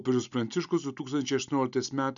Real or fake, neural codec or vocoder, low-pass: real; none; 7.2 kHz